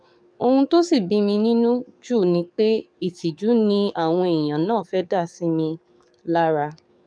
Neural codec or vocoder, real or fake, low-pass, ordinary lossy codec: codec, 44.1 kHz, 7.8 kbps, DAC; fake; 9.9 kHz; MP3, 96 kbps